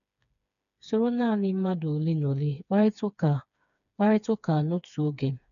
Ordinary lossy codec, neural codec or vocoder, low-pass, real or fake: none; codec, 16 kHz, 4 kbps, FreqCodec, smaller model; 7.2 kHz; fake